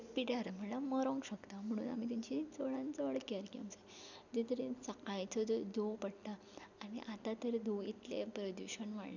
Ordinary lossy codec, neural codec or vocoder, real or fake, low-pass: none; none; real; 7.2 kHz